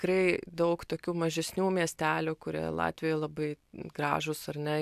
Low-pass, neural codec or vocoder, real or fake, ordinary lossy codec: 14.4 kHz; none; real; AAC, 96 kbps